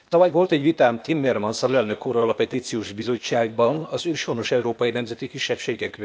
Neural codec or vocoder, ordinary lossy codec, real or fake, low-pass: codec, 16 kHz, 0.8 kbps, ZipCodec; none; fake; none